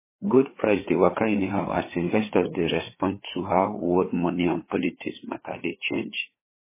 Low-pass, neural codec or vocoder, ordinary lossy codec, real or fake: 3.6 kHz; codec, 16 kHz, 4 kbps, FreqCodec, larger model; MP3, 16 kbps; fake